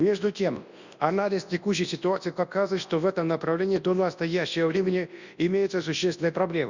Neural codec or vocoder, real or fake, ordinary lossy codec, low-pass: codec, 24 kHz, 0.9 kbps, WavTokenizer, large speech release; fake; Opus, 64 kbps; 7.2 kHz